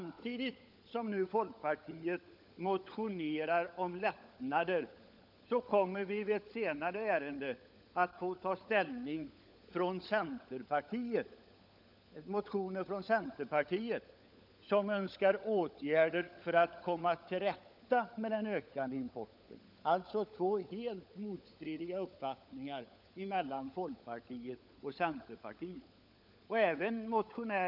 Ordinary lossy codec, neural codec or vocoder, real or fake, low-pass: none; codec, 16 kHz, 16 kbps, FunCodec, trained on LibriTTS, 50 frames a second; fake; 5.4 kHz